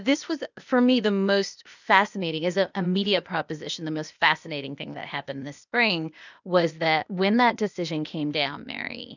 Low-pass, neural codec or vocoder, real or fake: 7.2 kHz; codec, 16 kHz, 0.8 kbps, ZipCodec; fake